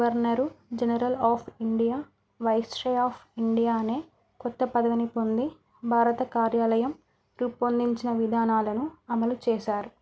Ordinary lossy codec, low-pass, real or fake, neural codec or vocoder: none; none; real; none